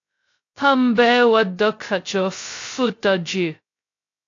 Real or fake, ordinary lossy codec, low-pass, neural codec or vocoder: fake; AAC, 48 kbps; 7.2 kHz; codec, 16 kHz, 0.2 kbps, FocalCodec